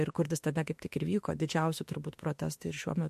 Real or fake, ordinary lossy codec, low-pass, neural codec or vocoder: fake; MP3, 64 kbps; 14.4 kHz; autoencoder, 48 kHz, 32 numbers a frame, DAC-VAE, trained on Japanese speech